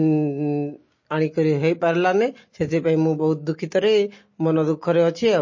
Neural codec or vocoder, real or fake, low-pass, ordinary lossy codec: none; real; 7.2 kHz; MP3, 32 kbps